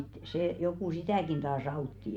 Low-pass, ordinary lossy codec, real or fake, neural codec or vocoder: 19.8 kHz; MP3, 96 kbps; fake; vocoder, 44.1 kHz, 128 mel bands every 256 samples, BigVGAN v2